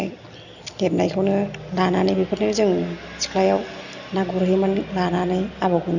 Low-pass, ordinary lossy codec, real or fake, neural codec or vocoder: 7.2 kHz; none; real; none